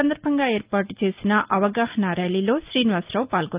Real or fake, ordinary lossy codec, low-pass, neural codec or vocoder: real; Opus, 32 kbps; 3.6 kHz; none